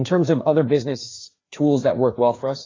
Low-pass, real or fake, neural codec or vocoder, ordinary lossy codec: 7.2 kHz; fake; codec, 16 kHz, 2 kbps, FreqCodec, larger model; AAC, 32 kbps